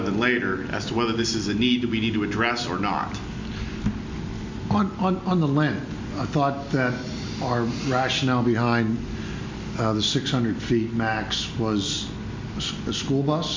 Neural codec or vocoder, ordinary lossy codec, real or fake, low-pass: none; MP3, 48 kbps; real; 7.2 kHz